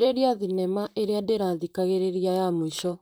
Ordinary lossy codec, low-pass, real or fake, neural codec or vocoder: none; none; fake; vocoder, 44.1 kHz, 128 mel bands, Pupu-Vocoder